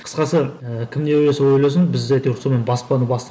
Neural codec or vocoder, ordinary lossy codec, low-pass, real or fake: none; none; none; real